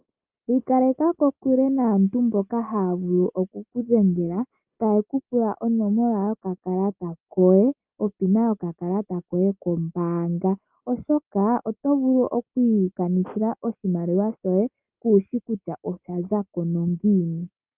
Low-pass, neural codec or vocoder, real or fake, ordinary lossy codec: 3.6 kHz; none; real; Opus, 24 kbps